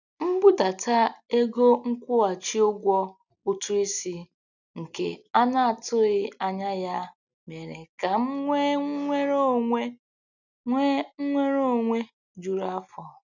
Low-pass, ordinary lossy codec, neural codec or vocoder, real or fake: 7.2 kHz; none; none; real